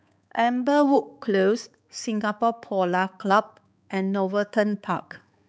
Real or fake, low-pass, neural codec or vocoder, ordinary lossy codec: fake; none; codec, 16 kHz, 4 kbps, X-Codec, HuBERT features, trained on balanced general audio; none